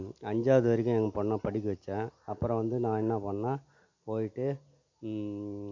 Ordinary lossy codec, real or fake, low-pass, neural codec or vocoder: MP3, 48 kbps; real; 7.2 kHz; none